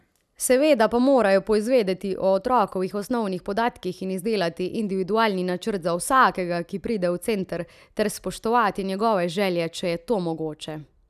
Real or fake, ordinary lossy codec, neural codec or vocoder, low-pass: real; none; none; 14.4 kHz